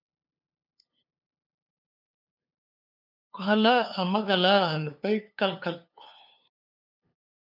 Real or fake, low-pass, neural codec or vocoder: fake; 5.4 kHz; codec, 16 kHz, 2 kbps, FunCodec, trained on LibriTTS, 25 frames a second